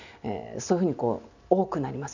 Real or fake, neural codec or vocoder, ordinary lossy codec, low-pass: real; none; none; 7.2 kHz